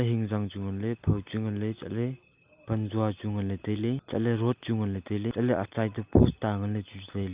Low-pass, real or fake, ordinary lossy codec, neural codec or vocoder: 3.6 kHz; real; Opus, 24 kbps; none